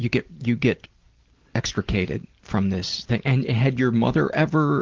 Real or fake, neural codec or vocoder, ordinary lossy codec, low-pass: real; none; Opus, 16 kbps; 7.2 kHz